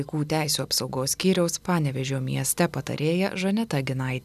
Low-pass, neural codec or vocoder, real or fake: 14.4 kHz; none; real